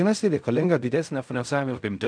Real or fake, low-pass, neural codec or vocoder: fake; 9.9 kHz; codec, 16 kHz in and 24 kHz out, 0.4 kbps, LongCat-Audio-Codec, fine tuned four codebook decoder